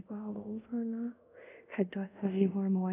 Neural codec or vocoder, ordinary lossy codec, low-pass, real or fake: codec, 24 kHz, 0.5 kbps, DualCodec; none; 3.6 kHz; fake